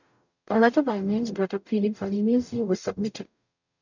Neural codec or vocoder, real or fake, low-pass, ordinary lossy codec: codec, 44.1 kHz, 0.9 kbps, DAC; fake; 7.2 kHz; none